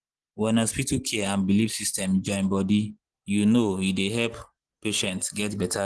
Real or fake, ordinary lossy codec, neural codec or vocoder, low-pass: real; Opus, 16 kbps; none; 10.8 kHz